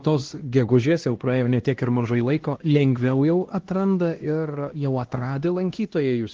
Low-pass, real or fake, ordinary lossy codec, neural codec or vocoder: 7.2 kHz; fake; Opus, 16 kbps; codec, 16 kHz, 1 kbps, X-Codec, HuBERT features, trained on LibriSpeech